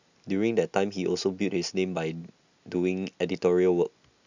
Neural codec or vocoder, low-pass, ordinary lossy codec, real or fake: none; 7.2 kHz; none; real